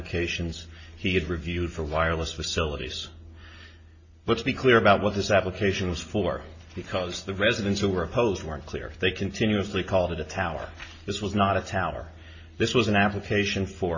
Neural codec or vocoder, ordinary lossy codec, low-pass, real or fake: none; MP3, 32 kbps; 7.2 kHz; real